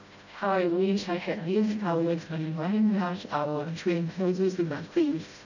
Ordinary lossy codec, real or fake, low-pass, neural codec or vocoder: none; fake; 7.2 kHz; codec, 16 kHz, 0.5 kbps, FreqCodec, smaller model